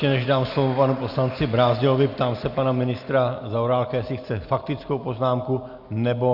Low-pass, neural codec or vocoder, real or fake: 5.4 kHz; none; real